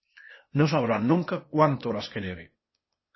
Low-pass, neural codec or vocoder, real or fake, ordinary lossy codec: 7.2 kHz; codec, 16 kHz, 0.8 kbps, ZipCodec; fake; MP3, 24 kbps